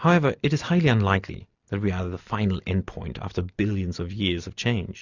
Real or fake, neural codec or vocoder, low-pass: real; none; 7.2 kHz